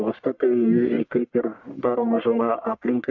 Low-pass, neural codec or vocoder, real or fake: 7.2 kHz; codec, 44.1 kHz, 1.7 kbps, Pupu-Codec; fake